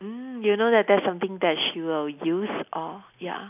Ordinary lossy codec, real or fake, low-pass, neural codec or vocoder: none; real; 3.6 kHz; none